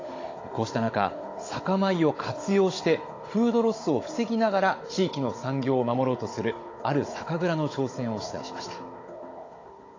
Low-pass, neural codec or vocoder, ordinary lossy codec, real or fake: 7.2 kHz; codec, 16 kHz, 16 kbps, FunCodec, trained on Chinese and English, 50 frames a second; AAC, 32 kbps; fake